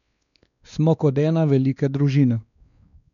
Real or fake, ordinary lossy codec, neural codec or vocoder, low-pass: fake; none; codec, 16 kHz, 2 kbps, X-Codec, WavLM features, trained on Multilingual LibriSpeech; 7.2 kHz